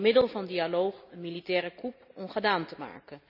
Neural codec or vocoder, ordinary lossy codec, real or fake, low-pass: none; none; real; 5.4 kHz